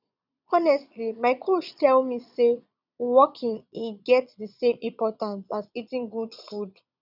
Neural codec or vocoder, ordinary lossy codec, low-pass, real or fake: vocoder, 22.05 kHz, 80 mel bands, Vocos; none; 5.4 kHz; fake